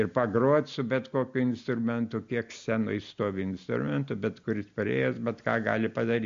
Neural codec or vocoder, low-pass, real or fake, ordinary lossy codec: none; 7.2 kHz; real; MP3, 48 kbps